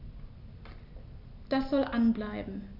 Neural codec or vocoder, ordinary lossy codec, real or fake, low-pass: none; none; real; 5.4 kHz